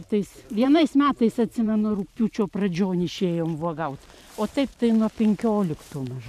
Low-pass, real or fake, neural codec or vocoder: 14.4 kHz; fake; vocoder, 44.1 kHz, 128 mel bands every 512 samples, BigVGAN v2